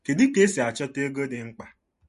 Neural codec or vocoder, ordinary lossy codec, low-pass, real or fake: vocoder, 44.1 kHz, 128 mel bands every 512 samples, BigVGAN v2; MP3, 48 kbps; 14.4 kHz; fake